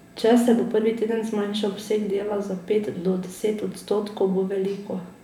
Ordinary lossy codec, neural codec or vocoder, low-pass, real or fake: none; vocoder, 44.1 kHz, 128 mel bands every 512 samples, BigVGAN v2; 19.8 kHz; fake